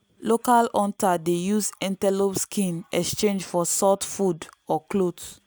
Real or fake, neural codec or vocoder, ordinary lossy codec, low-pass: real; none; none; none